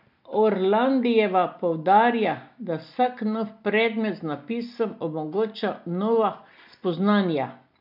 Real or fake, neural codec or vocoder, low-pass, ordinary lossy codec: real; none; 5.4 kHz; none